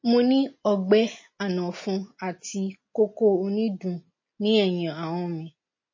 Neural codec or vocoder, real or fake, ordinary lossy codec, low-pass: none; real; MP3, 32 kbps; 7.2 kHz